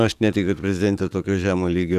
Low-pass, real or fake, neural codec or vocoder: 14.4 kHz; fake; codec, 44.1 kHz, 7.8 kbps, DAC